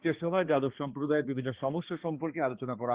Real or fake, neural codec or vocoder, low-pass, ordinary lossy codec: fake; codec, 16 kHz, 2 kbps, X-Codec, HuBERT features, trained on balanced general audio; 3.6 kHz; Opus, 16 kbps